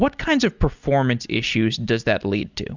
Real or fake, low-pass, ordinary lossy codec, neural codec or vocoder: real; 7.2 kHz; Opus, 64 kbps; none